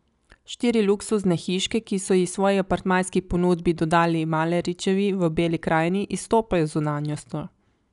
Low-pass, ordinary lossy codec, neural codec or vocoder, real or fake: 10.8 kHz; none; none; real